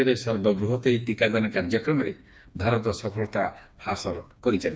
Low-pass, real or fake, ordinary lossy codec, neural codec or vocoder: none; fake; none; codec, 16 kHz, 2 kbps, FreqCodec, smaller model